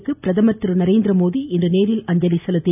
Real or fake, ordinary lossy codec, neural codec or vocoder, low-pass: real; none; none; 3.6 kHz